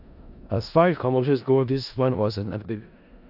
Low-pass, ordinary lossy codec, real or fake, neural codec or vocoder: 5.4 kHz; AAC, 48 kbps; fake; codec, 16 kHz in and 24 kHz out, 0.4 kbps, LongCat-Audio-Codec, four codebook decoder